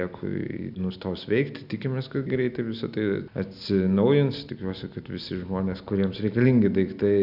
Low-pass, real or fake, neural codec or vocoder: 5.4 kHz; real; none